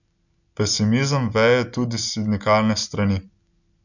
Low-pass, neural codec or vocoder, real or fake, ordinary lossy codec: 7.2 kHz; none; real; none